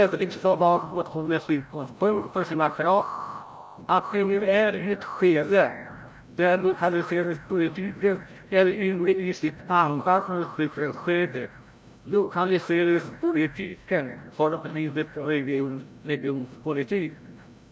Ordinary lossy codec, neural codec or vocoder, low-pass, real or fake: none; codec, 16 kHz, 0.5 kbps, FreqCodec, larger model; none; fake